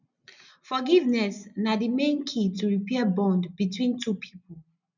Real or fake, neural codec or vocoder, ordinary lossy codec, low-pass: real; none; none; 7.2 kHz